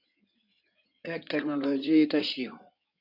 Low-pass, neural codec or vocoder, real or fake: 5.4 kHz; codec, 16 kHz in and 24 kHz out, 2.2 kbps, FireRedTTS-2 codec; fake